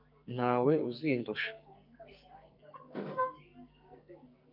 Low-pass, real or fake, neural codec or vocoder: 5.4 kHz; fake; codec, 44.1 kHz, 2.6 kbps, SNAC